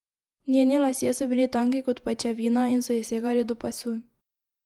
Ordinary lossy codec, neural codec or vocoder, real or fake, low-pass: Opus, 32 kbps; vocoder, 48 kHz, 128 mel bands, Vocos; fake; 19.8 kHz